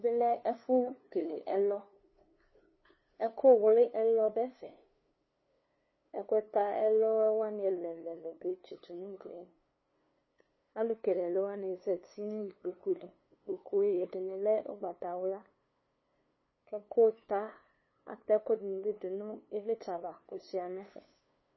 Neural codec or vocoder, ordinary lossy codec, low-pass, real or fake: codec, 16 kHz, 2 kbps, FunCodec, trained on LibriTTS, 25 frames a second; MP3, 24 kbps; 7.2 kHz; fake